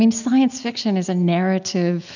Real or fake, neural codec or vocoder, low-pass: real; none; 7.2 kHz